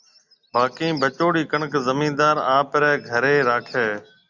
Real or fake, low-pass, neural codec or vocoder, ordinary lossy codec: real; 7.2 kHz; none; Opus, 64 kbps